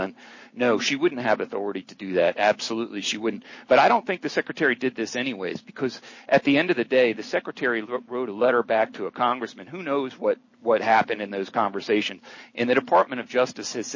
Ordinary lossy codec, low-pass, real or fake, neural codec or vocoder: MP3, 32 kbps; 7.2 kHz; real; none